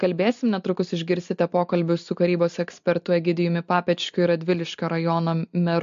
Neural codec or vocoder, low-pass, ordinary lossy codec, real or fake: none; 7.2 kHz; MP3, 48 kbps; real